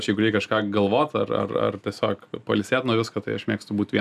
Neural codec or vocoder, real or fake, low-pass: none; real; 14.4 kHz